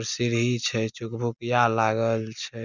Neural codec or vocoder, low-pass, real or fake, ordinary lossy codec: none; 7.2 kHz; real; none